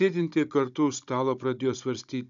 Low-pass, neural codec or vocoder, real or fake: 7.2 kHz; codec, 16 kHz, 16 kbps, FunCodec, trained on Chinese and English, 50 frames a second; fake